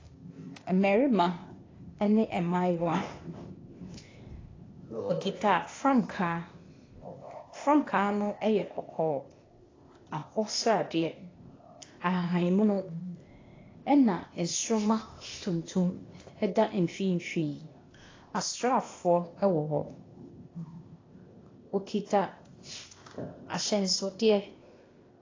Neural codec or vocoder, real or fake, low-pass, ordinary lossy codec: codec, 16 kHz, 0.8 kbps, ZipCodec; fake; 7.2 kHz; AAC, 32 kbps